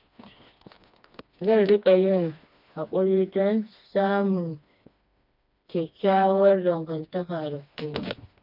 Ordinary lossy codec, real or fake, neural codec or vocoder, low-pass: MP3, 48 kbps; fake; codec, 16 kHz, 2 kbps, FreqCodec, smaller model; 5.4 kHz